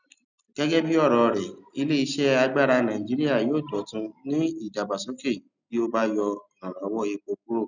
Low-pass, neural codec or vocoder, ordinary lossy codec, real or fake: 7.2 kHz; none; none; real